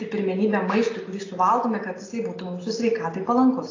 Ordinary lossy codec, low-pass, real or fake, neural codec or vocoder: AAC, 32 kbps; 7.2 kHz; real; none